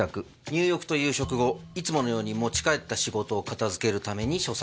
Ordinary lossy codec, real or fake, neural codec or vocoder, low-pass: none; real; none; none